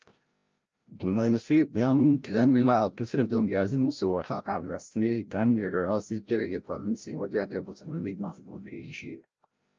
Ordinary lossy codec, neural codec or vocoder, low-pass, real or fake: Opus, 24 kbps; codec, 16 kHz, 0.5 kbps, FreqCodec, larger model; 7.2 kHz; fake